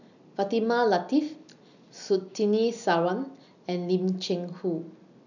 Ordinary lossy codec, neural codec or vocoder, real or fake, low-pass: none; none; real; 7.2 kHz